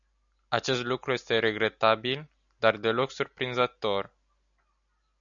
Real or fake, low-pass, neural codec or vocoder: real; 7.2 kHz; none